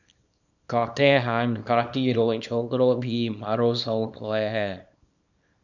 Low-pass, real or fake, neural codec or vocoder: 7.2 kHz; fake; codec, 24 kHz, 0.9 kbps, WavTokenizer, small release